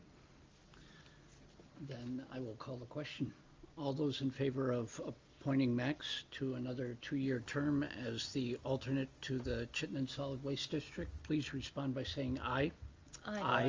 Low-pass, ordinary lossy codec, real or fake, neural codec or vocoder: 7.2 kHz; Opus, 32 kbps; real; none